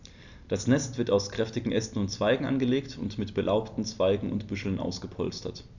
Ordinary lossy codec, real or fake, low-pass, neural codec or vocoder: none; real; 7.2 kHz; none